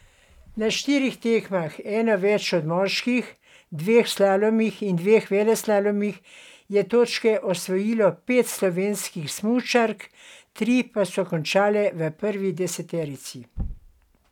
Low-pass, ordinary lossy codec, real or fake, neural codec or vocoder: 19.8 kHz; none; real; none